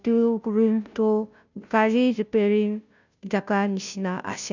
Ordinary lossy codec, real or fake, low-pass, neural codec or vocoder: none; fake; 7.2 kHz; codec, 16 kHz, 0.5 kbps, FunCodec, trained on Chinese and English, 25 frames a second